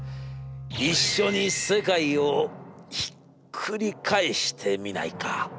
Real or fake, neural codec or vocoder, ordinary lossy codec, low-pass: real; none; none; none